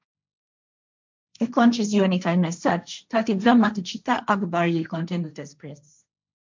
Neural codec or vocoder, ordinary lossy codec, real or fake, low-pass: codec, 16 kHz, 1.1 kbps, Voila-Tokenizer; MP3, 64 kbps; fake; 7.2 kHz